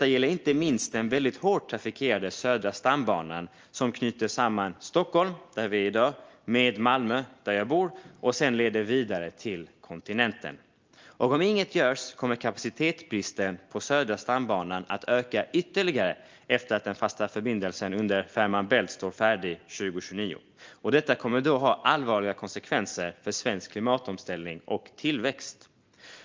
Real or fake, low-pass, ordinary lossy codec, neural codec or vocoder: real; 7.2 kHz; Opus, 24 kbps; none